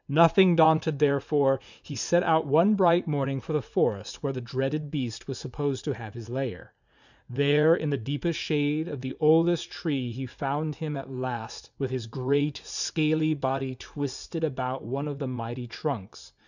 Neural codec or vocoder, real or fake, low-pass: vocoder, 44.1 kHz, 80 mel bands, Vocos; fake; 7.2 kHz